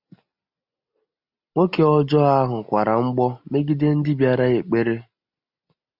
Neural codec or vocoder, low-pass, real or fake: none; 5.4 kHz; real